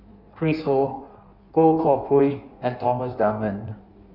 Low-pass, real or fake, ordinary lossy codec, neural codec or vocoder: 5.4 kHz; fake; none; codec, 16 kHz in and 24 kHz out, 1.1 kbps, FireRedTTS-2 codec